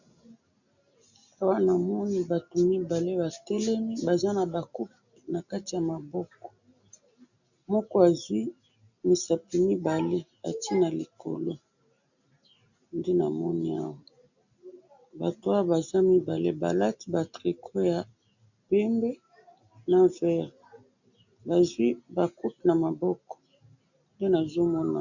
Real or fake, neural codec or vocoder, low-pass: real; none; 7.2 kHz